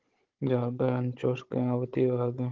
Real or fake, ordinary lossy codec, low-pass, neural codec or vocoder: fake; Opus, 16 kbps; 7.2 kHz; codec, 16 kHz, 16 kbps, FunCodec, trained on Chinese and English, 50 frames a second